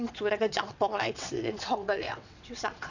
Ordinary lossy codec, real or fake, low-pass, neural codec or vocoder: none; fake; 7.2 kHz; vocoder, 22.05 kHz, 80 mel bands, WaveNeXt